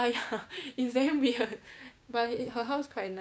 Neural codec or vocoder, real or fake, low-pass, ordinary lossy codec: codec, 16 kHz, 6 kbps, DAC; fake; none; none